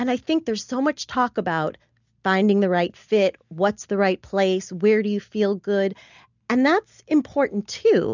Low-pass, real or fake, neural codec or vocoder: 7.2 kHz; real; none